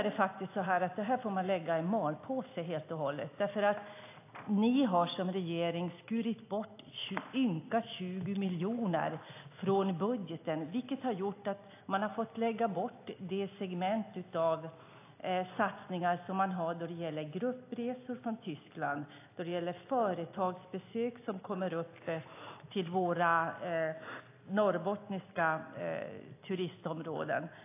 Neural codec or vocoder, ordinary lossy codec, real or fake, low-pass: none; AAC, 24 kbps; real; 3.6 kHz